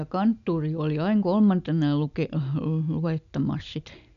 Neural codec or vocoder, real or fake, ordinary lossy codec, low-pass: none; real; none; 7.2 kHz